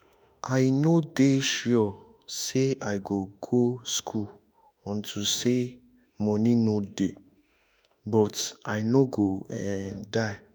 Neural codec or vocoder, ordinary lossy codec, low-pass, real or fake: autoencoder, 48 kHz, 32 numbers a frame, DAC-VAE, trained on Japanese speech; none; none; fake